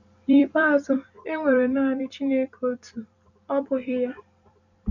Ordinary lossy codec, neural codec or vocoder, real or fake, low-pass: none; vocoder, 44.1 kHz, 128 mel bands every 256 samples, BigVGAN v2; fake; 7.2 kHz